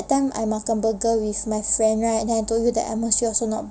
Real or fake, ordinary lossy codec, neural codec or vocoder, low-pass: real; none; none; none